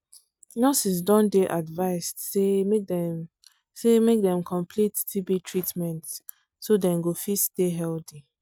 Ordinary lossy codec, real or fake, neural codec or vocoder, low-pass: none; real; none; 19.8 kHz